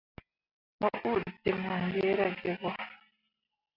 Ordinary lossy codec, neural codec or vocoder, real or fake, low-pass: AAC, 48 kbps; none; real; 5.4 kHz